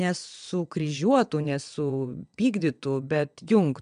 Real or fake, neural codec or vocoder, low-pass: fake; vocoder, 22.05 kHz, 80 mel bands, WaveNeXt; 9.9 kHz